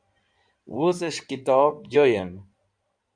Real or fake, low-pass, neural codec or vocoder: fake; 9.9 kHz; codec, 16 kHz in and 24 kHz out, 2.2 kbps, FireRedTTS-2 codec